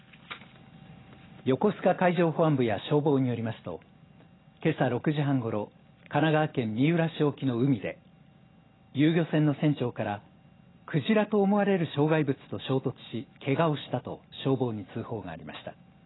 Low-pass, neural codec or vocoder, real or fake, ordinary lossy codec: 7.2 kHz; none; real; AAC, 16 kbps